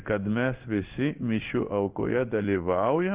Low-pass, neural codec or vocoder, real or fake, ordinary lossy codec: 3.6 kHz; codec, 16 kHz in and 24 kHz out, 1 kbps, XY-Tokenizer; fake; Opus, 24 kbps